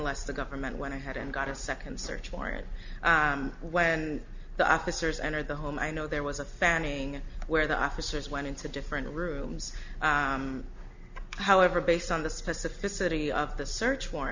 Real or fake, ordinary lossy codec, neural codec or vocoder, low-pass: real; Opus, 64 kbps; none; 7.2 kHz